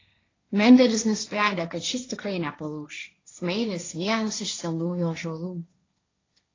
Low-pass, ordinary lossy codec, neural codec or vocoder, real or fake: 7.2 kHz; AAC, 32 kbps; codec, 16 kHz, 1.1 kbps, Voila-Tokenizer; fake